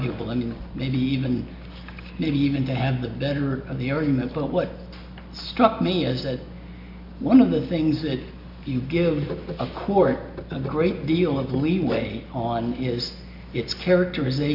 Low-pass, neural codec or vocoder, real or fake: 5.4 kHz; none; real